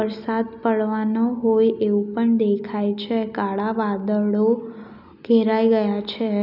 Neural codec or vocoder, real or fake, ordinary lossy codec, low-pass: none; real; none; 5.4 kHz